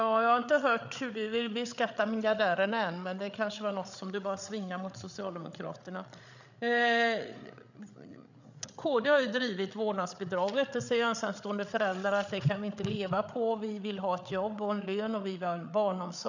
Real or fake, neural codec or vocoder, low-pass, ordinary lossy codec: fake; codec, 16 kHz, 8 kbps, FreqCodec, larger model; 7.2 kHz; none